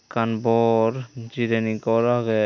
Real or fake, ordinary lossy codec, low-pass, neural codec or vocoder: real; none; 7.2 kHz; none